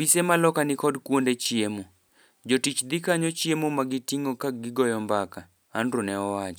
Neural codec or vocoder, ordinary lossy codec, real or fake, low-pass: none; none; real; none